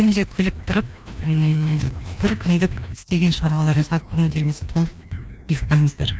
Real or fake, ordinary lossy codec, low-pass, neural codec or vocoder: fake; none; none; codec, 16 kHz, 1 kbps, FreqCodec, larger model